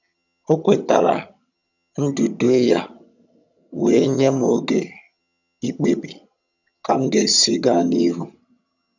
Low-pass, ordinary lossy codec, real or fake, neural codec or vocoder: 7.2 kHz; none; fake; vocoder, 22.05 kHz, 80 mel bands, HiFi-GAN